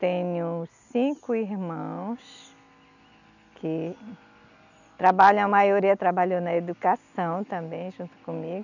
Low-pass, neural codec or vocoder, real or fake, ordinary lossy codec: 7.2 kHz; none; real; none